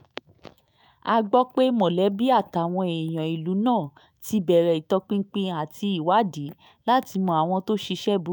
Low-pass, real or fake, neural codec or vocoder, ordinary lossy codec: none; fake; autoencoder, 48 kHz, 128 numbers a frame, DAC-VAE, trained on Japanese speech; none